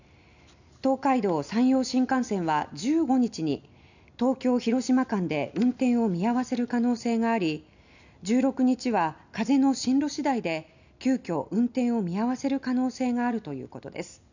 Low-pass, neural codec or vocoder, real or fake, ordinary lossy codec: 7.2 kHz; none; real; none